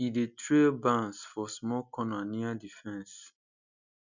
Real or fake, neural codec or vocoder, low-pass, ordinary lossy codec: real; none; 7.2 kHz; none